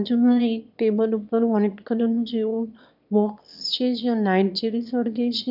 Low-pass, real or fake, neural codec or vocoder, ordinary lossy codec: 5.4 kHz; fake; autoencoder, 22.05 kHz, a latent of 192 numbers a frame, VITS, trained on one speaker; none